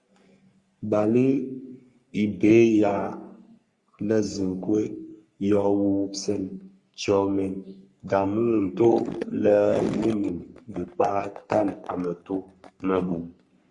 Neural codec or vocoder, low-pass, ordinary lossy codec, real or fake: codec, 44.1 kHz, 3.4 kbps, Pupu-Codec; 10.8 kHz; Opus, 64 kbps; fake